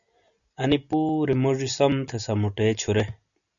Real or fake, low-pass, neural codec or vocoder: real; 7.2 kHz; none